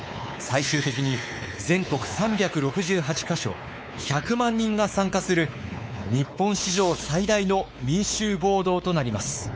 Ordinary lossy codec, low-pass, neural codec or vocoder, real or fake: none; none; codec, 16 kHz, 4 kbps, X-Codec, WavLM features, trained on Multilingual LibriSpeech; fake